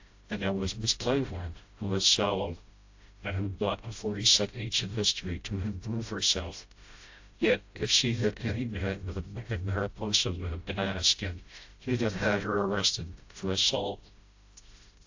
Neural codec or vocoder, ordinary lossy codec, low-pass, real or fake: codec, 16 kHz, 0.5 kbps, FreqCodec, smaller model; AAC, 48 kbps; 7.2 kHz; fake